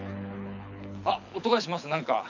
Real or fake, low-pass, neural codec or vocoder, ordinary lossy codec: fake; 7.2 kHz; codec, 24 kHz, 6 kbps, HILCodec; none